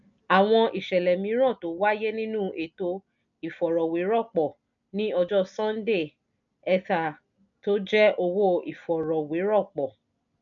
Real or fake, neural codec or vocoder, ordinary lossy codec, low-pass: real; none; none; 7.2 kHz